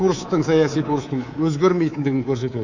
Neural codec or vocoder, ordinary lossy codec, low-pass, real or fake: codec, 24 kHz, 3.1 kbps, DualCodec; none; 7.2 kHz; fake